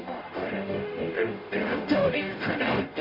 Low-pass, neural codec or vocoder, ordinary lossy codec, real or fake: 5.4 kHz; codec, 44.1 kHz, 0.9 kbps, DAC; MP3, 32 kbps; fake